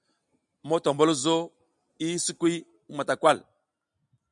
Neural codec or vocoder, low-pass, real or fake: none; 10.8 kHz; real